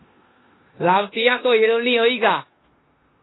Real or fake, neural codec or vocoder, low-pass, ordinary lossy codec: fake; codec, 16 kHz in and 24 kHz out, 0.9 kbps, LongCat-Audio-Codec, four codebook decoder; 7.2 kHz; AAC, 16 kbps